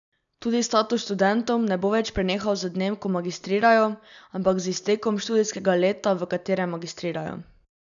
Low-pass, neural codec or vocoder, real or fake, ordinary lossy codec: 7.2 kHz; none; real; none